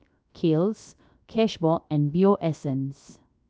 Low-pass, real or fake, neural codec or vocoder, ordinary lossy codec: none; fake; codec, 16 kHz, 0.7 kbps, FocalCodec; none